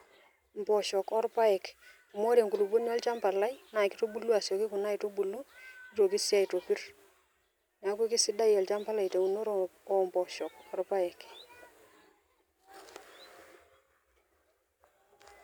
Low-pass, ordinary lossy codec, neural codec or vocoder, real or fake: none; none; none; real